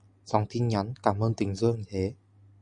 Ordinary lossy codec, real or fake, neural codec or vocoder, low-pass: Opus, 64 kbps; real; none; 9.9 kHz